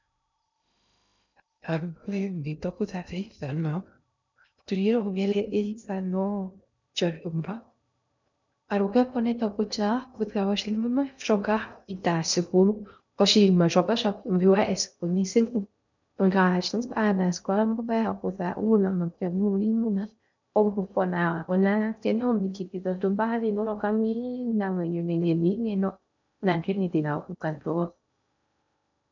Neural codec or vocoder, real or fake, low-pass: codec, 16 kHz in and 24 kHz out, 0.6 kbps, FocalCodec, streaming, 2048 codes; fake; 7.2 kHz